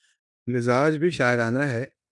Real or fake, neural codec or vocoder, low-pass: fake; codec, 16 kHz in and 24 kHz out, 0.9 kbps, LongCat-Audio-Codec, four codebook decoder; 10.8 kHz